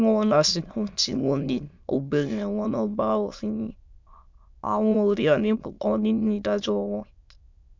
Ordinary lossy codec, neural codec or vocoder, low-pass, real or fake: MP3, 64 kbps; autoencoder, 22.05 kHz, a latent of 192 numbers a frame, VITS, trained on many speakers; 7.2 kHz; fake